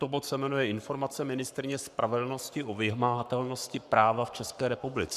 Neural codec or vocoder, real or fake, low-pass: codec, 44.1 kHz, 7.8 kbps, Pupu-Codec; fake; 14.4 kHz